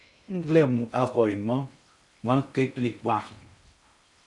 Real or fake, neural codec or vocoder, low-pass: fake; codec, 16 kHz in and 24 kHz out, 0.6 kbps, FocalCodec, streaming, 2048 codes; 10.8 kHz